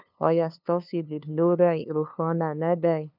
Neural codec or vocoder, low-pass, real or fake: codec, 16 kHz, 2 kbps, FunCodec, trained on LibriTTS, 25 frames a second; 5.4 kHz; fake